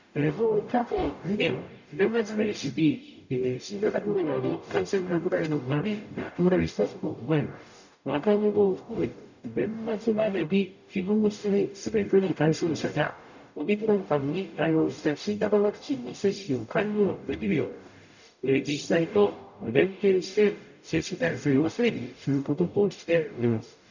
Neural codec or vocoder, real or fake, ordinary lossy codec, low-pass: codec, 44.1 kHz, 0.9 kbps, DAC; fake; none; 7.2 kHz